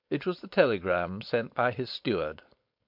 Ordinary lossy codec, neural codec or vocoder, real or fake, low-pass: MP3, 48 kbps; codec, 16 kHz, 4.8 kbps, FACodec; fake; 5.4 kHz